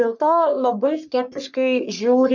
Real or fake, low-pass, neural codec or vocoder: fake; 7.2 kHz; codec, 44.1 kHz, 3.4 kbps, Pupu-Codec